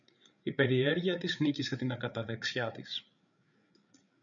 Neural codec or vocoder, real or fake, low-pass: codec, 16 kHz, 8 kbps, FreqCodec, larger model; fake; 7.2 kHz